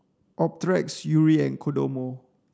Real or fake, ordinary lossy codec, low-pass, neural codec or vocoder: real; none; none; none